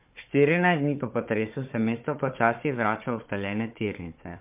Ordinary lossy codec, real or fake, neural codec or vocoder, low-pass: MP3, 32 kbps; fake; codec, 16 kHz, 4 kbps, FunCodec, trained on Chinese and English, 50 frames a second; 3.6 kHz